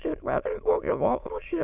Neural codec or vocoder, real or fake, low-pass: autoencoder, 22.05 kHz, a latent of 192 numbers a frame, VITS, trained on many speakers; fake; 3.6 kHz